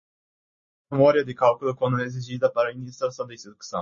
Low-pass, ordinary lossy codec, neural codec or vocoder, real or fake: 7.2 kHz; MP3, 32 kbps; none; real